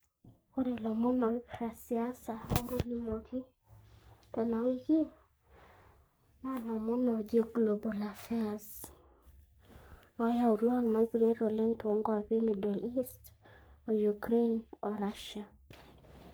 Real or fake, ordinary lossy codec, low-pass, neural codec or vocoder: fake; none; none; codec, 44.1 kHz, 3.4 kbps, Pupu-Codec